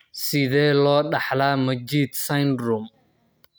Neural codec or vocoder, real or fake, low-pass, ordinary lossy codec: none; real; none; none